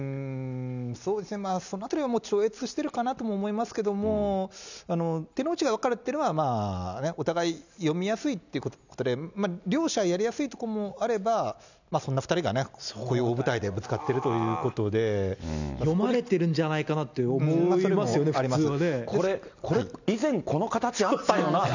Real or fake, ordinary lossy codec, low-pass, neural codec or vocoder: real; none; 7.2 kHz; none